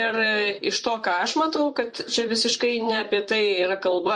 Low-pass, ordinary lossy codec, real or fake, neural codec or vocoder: 10.8 kHz; MP3, 48 kbps; fake; vocoder, 44.1 kHz, 128 mel bands, Pupu-Vocoder